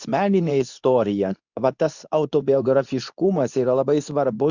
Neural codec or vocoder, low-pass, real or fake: codec, 24 kHz, 0.9 kbps, WavTokenizer, medium speech release version 2; 7.2 kHz; fake